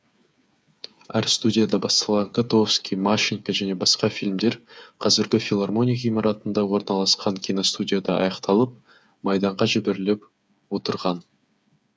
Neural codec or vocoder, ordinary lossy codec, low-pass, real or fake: codec, 16 kHz, 8 kbps, FreqCodec, smaller model; none; none; fake